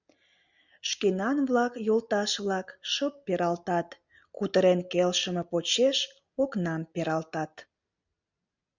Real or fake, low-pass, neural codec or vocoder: real; 7.2 kHz; none